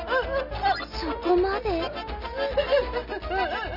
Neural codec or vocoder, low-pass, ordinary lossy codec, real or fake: none; 5.4 kHz; none; real